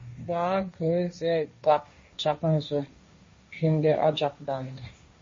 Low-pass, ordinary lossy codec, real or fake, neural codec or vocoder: 7.2 kHz; MP3, 32 kbps; fake; codec, 16 kHz, 1.1 kbps, Voila-Tokenizer